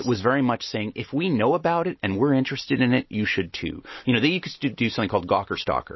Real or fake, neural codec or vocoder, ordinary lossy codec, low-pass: real; none; MP3, 24 kbps; 7.2 kHz